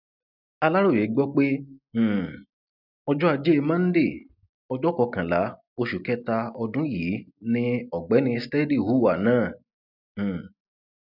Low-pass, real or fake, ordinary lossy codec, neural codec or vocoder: 5.4 kHz; real; none; none